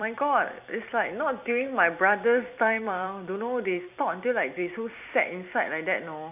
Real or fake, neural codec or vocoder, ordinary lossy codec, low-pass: fake; vocoder, 44.1 kHz, 128 mel bands every 256 samples, BigVGAN v2; none; 3.6 kHz